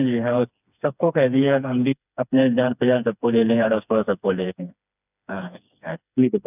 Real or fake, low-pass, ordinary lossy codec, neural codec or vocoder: fake; 3.6 kHz; none; codec, 16 kHz, 2 kbps, FreqCodec, smaller model